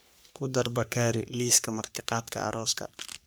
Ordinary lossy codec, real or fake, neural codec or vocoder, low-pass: none; fake; codec, 44.1 kHz, 3.4 kbps, Pupu-Codec; none